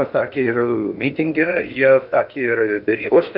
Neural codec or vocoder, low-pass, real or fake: codec, 16 kHz in and 24 kHz out, 0.8 kbps, FocalCodec, streaming, 65536 codes; 5.4 kHz; fake